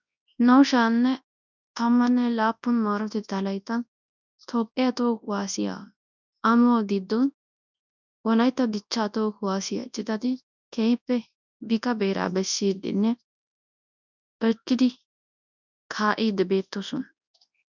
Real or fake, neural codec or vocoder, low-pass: fake; codec, 24 kHz, 0.9 kbps, WavTokenizer, large speech release; 7.2 kHz